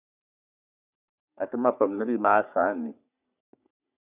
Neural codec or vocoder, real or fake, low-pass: codec, 44.1 kHz, 3.4 kbps, Pupu-Codec; fake; 3.6 kHz